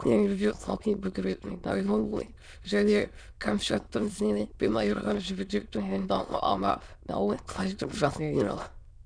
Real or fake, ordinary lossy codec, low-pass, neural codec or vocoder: fake; none; 9.9 kHz; autoencoder, 22.05 kHz, a latent of 192 numbers a frame, VITS, trained on many speakers